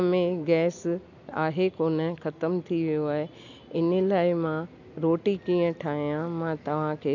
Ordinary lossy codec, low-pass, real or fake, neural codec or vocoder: none; 7.2 kHz; real; none